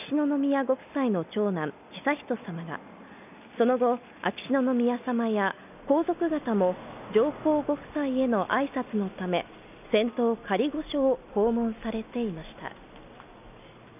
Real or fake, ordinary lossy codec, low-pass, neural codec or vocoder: real; none; 3.6 kHz; none